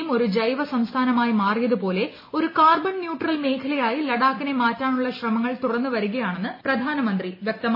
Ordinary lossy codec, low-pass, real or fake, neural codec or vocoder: MP3, 24 kbps; 5.4 kHz; real; none